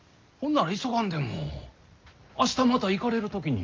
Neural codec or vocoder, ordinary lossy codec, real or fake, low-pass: none; Opus, 32 kbps; real; 7.2 kHz